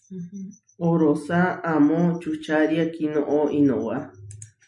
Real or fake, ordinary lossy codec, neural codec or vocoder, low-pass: real; MP3, 64 kbps; none; 10.8 kHz